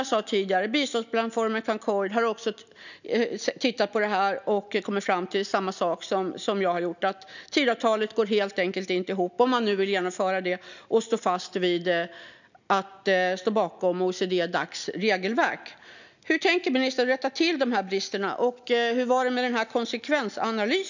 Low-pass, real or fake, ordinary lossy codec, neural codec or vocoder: 7.2 kHz; real; none; none